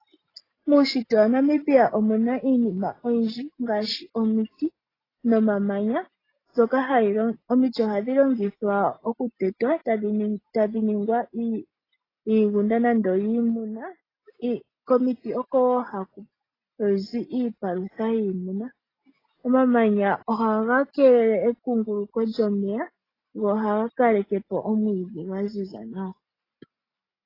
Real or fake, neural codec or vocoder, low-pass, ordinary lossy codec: real; none; 5.4 kHz; AAC, 24 kbps